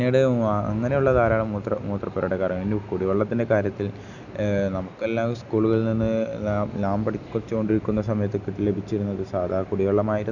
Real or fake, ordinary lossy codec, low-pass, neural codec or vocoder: real; none; 7.2 kHz; none